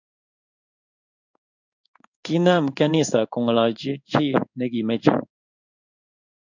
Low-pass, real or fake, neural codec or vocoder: 7.2 kHz; fake; codec, 16 kHz in and 24 kHz out, 1 kbps, XY-Tokenizer